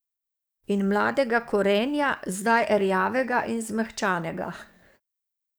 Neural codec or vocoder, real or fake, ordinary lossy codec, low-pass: codec, 44.1 kHz, 7.8 kbps, DAC; fake; none; none